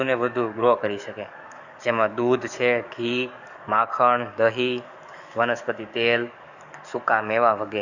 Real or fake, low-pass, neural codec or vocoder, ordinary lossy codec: fake; 7.2 kHz; codec, 44.1 kHz, 7.8 kbps, DAC; none